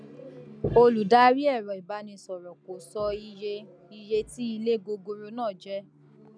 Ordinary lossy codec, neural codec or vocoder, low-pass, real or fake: none; none; 9.9 kHz; real